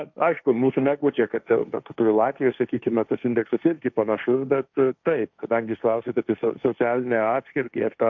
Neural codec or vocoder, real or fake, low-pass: codec, 16 kHz, 1.1 kbps, Voila-Tokenizer; fake; 7.2 kHz